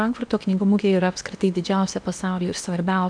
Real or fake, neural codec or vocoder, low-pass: fake; codec, 16 kHz in and 24 kHz out, 0.8 kbps, FocalCodec, streaming, 65536 codes; 9.9 kHz